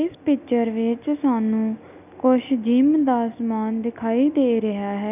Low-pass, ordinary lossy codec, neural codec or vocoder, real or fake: 3.6 kHz; none; none; real